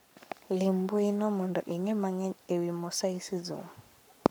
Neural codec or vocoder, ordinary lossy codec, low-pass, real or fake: codec, 44.1 kHz, 7.8 kbps, Pupu-Codec; none; none; fake